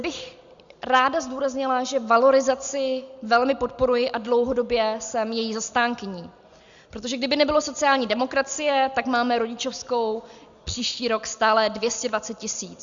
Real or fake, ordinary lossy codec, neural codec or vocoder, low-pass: real; Opus, 64 kbps; none; 7.2 kHz